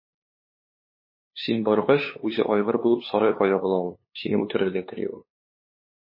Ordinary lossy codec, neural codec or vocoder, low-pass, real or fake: MP3, 24 kbps; codec, 16 kHz, 2 kbps, FunCodec, trained on LibriTTS, 25 frames a second; 5.4 kHz; fake